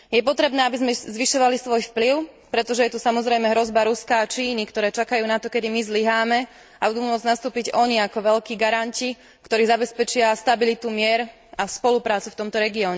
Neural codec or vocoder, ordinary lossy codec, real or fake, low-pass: none; none; real; none